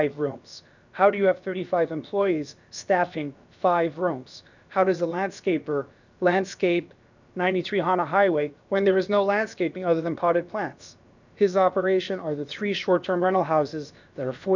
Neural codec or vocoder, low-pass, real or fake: codec, 16 kHz, about 1 kbps, DyCAST, with the encoder's durations; 7.2 kHz; fake